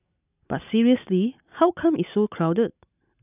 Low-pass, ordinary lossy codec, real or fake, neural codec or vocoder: 3.6 kHz; none; real; none